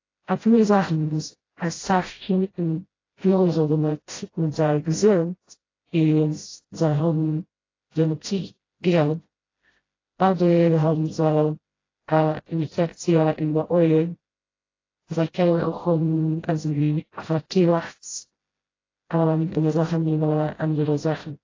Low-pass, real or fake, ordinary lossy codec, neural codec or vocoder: 7.2 kHz; fake; AAC, 32 kbps; codec, 16 kHz, 0.5 kbps, FreqCodec, smaller model